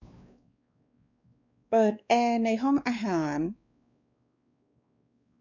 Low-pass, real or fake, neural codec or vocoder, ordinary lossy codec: 7.2 kHz; fake; codec, 16 kHz, 2 kbps, X-Codec, WavLM features, trained on Multilingual LibriSpeech; none